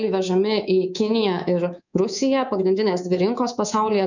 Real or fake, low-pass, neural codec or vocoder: fake; 7.2 kHz; codec, 24 kHz, 3.1 kbps, DualCodec